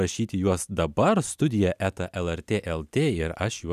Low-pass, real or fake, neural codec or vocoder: 14.4 kHz; real; none